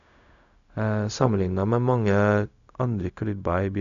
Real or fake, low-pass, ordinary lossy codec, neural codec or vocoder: fake; 7.2 kHz; none; codec, 16 kHz, 0.4 kbps, LongCat-Audio-Codec